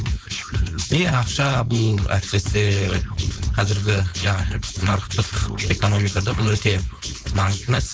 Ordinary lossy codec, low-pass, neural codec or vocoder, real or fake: none; none; codec, 16 kHz, 4.8 kbps, FACodec; fake